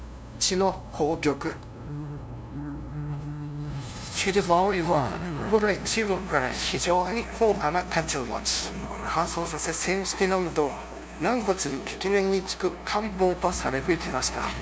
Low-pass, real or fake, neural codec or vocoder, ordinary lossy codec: none; fake; codec, 16 kHz, 0.5 kbps, FunCodec, trained on LibriTTS, 25 frames a second; none